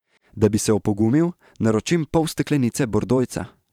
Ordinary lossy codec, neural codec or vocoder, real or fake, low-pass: none; vocoder, 44.1 kHz, 128 mel bands every 512 samples, BigVGAN v2; fake; 19.8 kHz